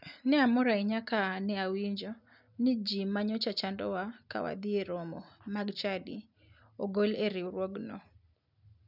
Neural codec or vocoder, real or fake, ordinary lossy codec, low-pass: none; real; none; 5.4 kHz